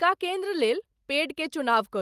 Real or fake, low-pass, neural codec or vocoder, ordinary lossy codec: real; 14.4 kHz; none; Opus, 32 kbps